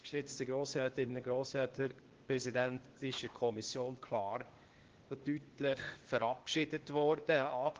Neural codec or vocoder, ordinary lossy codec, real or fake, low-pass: codec, 16 kHz, 0.8 kbps, ZipCodec; Opus, 16 kbps; fake; 7.2 kHz